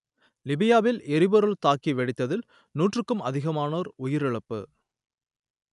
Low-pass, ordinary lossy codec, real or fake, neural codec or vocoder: 10.8 kHz; none; real; none